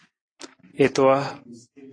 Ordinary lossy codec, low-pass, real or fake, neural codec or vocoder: AAC, 32 kbps; 9.9 kHz; real; none